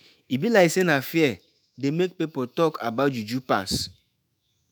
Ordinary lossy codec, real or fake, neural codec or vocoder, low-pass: none; fake; autoencoder, 48 kHz, 128 numbers a frame, DAC-VAE, trained on Japanese speech; none